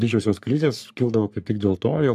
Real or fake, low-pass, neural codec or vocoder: fake; 14.4 kHz; codec, 44.1 kHz, 3.4 kbps, Pupu-Codec